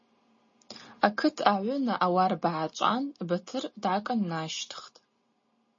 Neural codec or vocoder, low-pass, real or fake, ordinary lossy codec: none; 7.2 kHz; real; MP3, 32 kbps